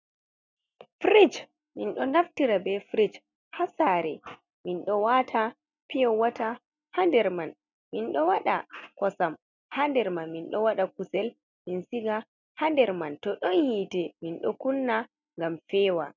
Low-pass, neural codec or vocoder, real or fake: 7.2 kHz; none; real